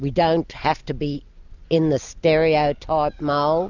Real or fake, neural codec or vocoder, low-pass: real; none; 7.2 kHz